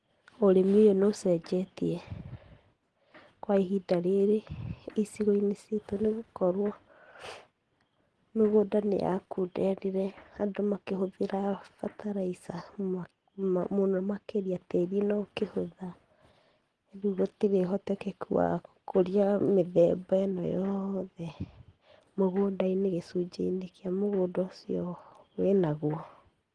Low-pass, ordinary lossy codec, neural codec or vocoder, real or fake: 9.9 kHz; Opus, 16 kbps; none; real